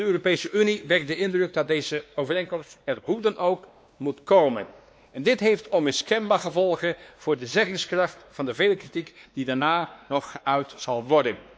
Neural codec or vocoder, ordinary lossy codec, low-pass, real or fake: codec, 16 kHz, 2 kbps, X-Codec, WavLM features, trained on Multilingual LibriSpeech; none; none; fake